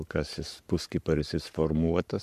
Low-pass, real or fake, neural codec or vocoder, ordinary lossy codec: 14.4 kHz; fake; codec, 44.1 kHz, 7.8 kbps, Pupu-Codec; MP3, 96 kbps